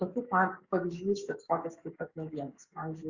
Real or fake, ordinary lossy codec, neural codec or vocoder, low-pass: fake; Opus, 64 kbps; codec, 44.1 kHz, 7.8 kbps, DAC; 7.2 kHz